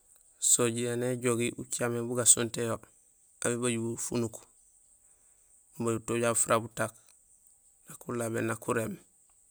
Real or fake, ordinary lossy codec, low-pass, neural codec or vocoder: real; none; none; none